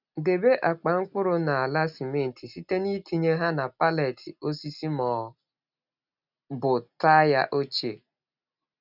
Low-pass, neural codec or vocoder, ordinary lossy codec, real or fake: 5.4 kHz; none; none; real